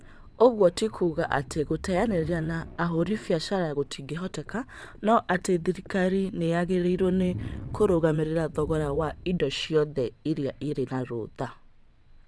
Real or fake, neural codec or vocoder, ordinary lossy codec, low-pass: fake; vocoder, 22.05 kHz, 80 mel bands, WaveNeXt; none; none